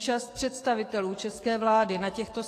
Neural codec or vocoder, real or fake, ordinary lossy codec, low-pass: codec, 44.1 kHz, 7.8 kbps, DAC; fake; AAC, 48 kbps; 14.4 kHz